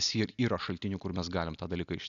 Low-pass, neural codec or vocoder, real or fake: 7.2 kHz; none; real